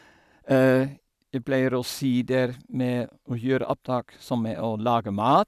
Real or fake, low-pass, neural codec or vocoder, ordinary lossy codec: real; 14.4 kHz; none; none